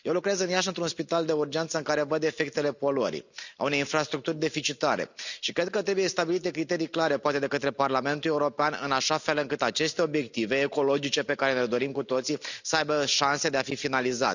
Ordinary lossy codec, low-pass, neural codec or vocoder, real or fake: none; 7.2 kHz; none; real